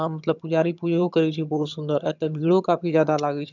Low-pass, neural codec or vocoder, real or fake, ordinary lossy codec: 7.2 kHz; vocoder, 22.05 kHz, 80 mel bands, HiFi-GAN; fake; none